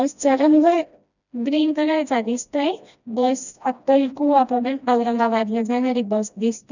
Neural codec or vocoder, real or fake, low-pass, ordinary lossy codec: codec, 16 kHz, 1 kbps, FreqCodec, smaller model; fake; 7.2 kHz; none